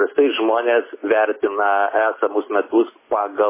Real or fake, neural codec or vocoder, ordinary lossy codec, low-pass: real; none; MP3, 16 kbps; 3.6 kHz